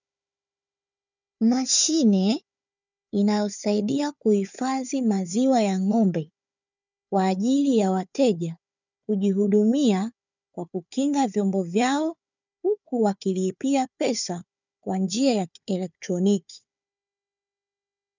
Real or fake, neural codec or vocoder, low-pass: fake; codec, 16 kHz, 4 kbps, FunCodec, trained on Chinese and English, 50 frames a second; 7.2 kHz